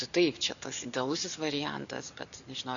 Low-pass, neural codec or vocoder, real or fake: 7.2 kHz; none; real